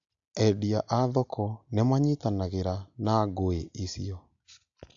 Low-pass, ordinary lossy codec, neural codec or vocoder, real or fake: 7.2 kHz; AAC, 64 kbps; none; real